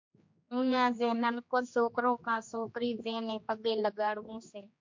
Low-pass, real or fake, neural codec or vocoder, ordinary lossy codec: 7.2 kHz; fake; codec, 16 kHz, 2 kbps, X-Codec, HuBERT features, trained on general audio; MP3, 48 kbps